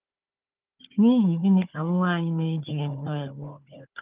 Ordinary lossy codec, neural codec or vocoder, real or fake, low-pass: Opus, 32 kbps; codec, 16 kHz, 4 kbps, FunCodec, trained on Chinese and English, 50 frames a second; fake; 3.6 kHz